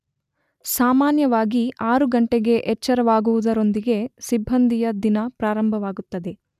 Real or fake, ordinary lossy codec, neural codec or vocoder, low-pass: real; none; none; 14.4 kHz